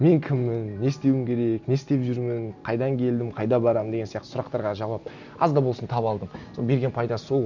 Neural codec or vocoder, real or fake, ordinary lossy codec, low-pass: none; real; none; 7.2 kHz